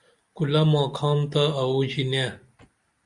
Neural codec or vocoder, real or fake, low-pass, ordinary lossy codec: none; real; 10.8 kHz; Opus, 64 kbps